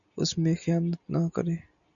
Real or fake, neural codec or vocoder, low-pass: real; none; 7.2 kHz